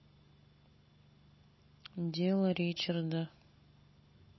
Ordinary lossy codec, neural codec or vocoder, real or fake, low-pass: MP3, 24 kbps; none; real; 7.2 kHz